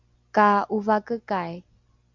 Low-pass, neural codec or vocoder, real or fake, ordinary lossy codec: 7.2 kHz; none; real; Opus, 64 kbps